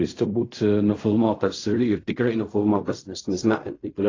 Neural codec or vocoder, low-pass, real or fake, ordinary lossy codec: codec, 16 kHz in and 24 kHz out, 0.4 kbps, LongCat-Audio-Codec, fine tuned four codebook decoder; 7.2 kHz; fake; AAC, 32 kbps